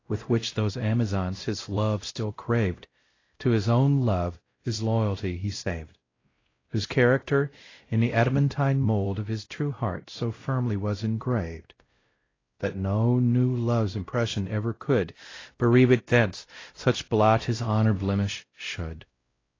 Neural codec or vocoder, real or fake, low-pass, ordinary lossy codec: codec, 16 kHz, 0.5 kbps, X-Codec, WavLM features, trained on Multilingual LibriSpeech; fake; 7.2 kHz; AAC, 32 kbps